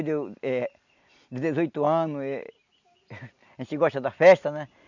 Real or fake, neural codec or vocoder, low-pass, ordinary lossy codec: real; none; 7.2 kHz; none